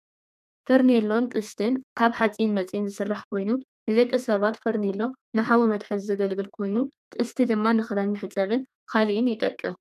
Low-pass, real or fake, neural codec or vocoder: 14.4 kHz; fake; codec, 32 kHz, 1.9 kbps, SNAC